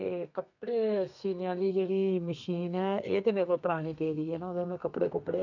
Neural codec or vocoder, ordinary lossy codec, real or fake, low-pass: codec, 32 kHz, 1.9 kbps, SNAC; none; fake; 7.2 kHz